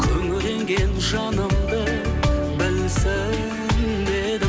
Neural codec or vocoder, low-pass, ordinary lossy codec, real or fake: none; none; none; real